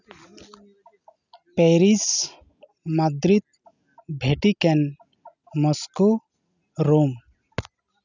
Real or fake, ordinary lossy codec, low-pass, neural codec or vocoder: real; none; 7.2 kHz; none